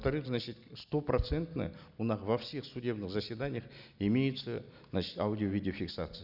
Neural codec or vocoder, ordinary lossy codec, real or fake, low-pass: none; none; real; 5.4 kHz